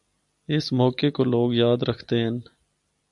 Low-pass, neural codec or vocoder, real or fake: 10.8 kHz; none; real